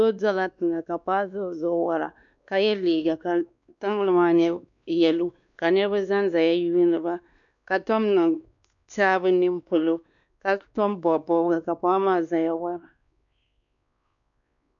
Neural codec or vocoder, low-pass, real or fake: codec, 16 kHz, 2 kbps, X-Codec, WavLM features, trained on Multilingual LibriSpeech; 7.2 kHz; fake